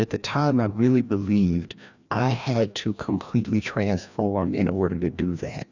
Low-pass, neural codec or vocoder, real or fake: 7.2 kHz; codec, 16 kHz, 1 kbps, FreqCodec, larger model; fake